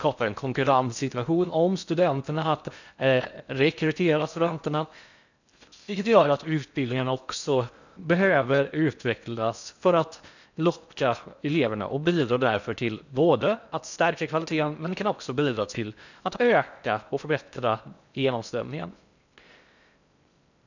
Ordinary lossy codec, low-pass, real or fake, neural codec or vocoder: none; 7.2 kHz; fake; codec, 16 kHz in and 24 kHz out, 0.6 kbps, FocalCodec, streaming, 4096 codes